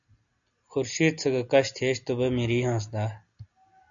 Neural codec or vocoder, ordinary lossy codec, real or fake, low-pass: none; AAC, 64 kbps; real; 7.2 kHz